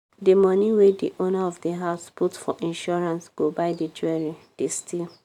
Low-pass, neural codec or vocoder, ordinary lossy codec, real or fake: 19.8 kHz; vocoder, 44.1 kHz, 128 mel bands every 512 samples, BigVGAN v2; none; fake